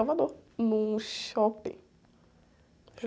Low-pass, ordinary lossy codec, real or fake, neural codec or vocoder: none; none; real; none